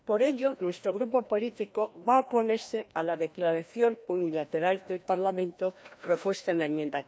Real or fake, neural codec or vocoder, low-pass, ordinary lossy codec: fake; codec, 16 kHz, 1 kbps, FreqCodec, larger model; none; none